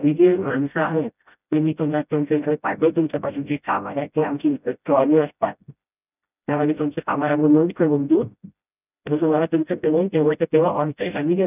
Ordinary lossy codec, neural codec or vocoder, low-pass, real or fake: none; codec, 16 kHz, 0.5 kbps, FreqCodec, smaller model; 3.6 kHz; fake